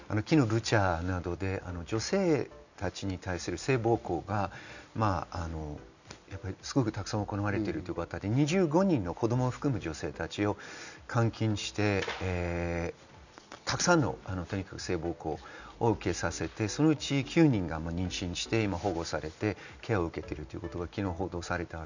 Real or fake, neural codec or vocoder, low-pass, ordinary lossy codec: real; none; 7.2 kHz; none